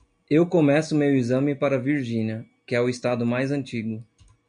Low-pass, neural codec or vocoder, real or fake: 9.9 kHz; none; real